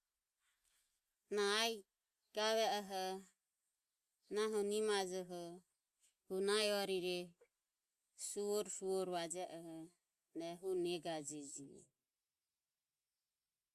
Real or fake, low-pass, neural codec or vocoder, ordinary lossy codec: real; 14.4 kHz; none; Opus, 64 kbps